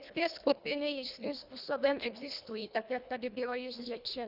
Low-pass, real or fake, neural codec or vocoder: 5.4 kHz; fake; codec, 24 kHz, 1.5 kbps, HILCodec